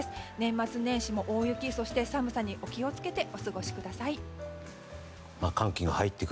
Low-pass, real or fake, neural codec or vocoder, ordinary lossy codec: none; real; none; none